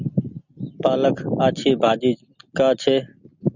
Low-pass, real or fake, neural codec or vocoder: 7.2 kHz; real; none